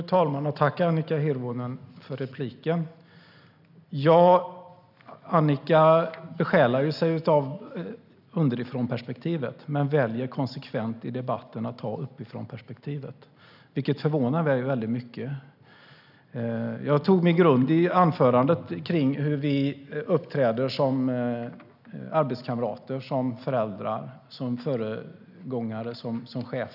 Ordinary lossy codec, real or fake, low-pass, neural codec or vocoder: none; real; 5.4 kHz; none